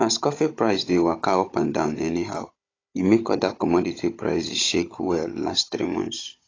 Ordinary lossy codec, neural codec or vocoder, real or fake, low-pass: AAC, 32 kbps; codec, 16 kHz, 16 kbps, FunCodec, trained on Chinese and English, 50 frames a second; fake; 7.2 kHz